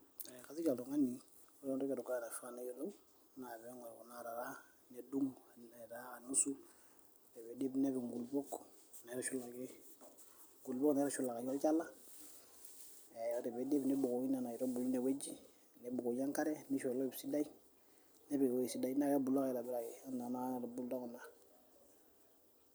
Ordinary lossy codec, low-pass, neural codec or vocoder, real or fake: none; none; vocoder, 44.1 kHz, 128 mel bands every 256 samples, BigVGAN v2; fake